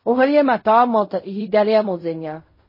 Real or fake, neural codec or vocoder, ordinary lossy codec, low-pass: fake; codec, 16 kHz in and 24 kHz out, 0.4 kbps, LongCat-Audio-Codec, fine tuned four codebook decoder; MP3, 24 kbps; 5.4 kHz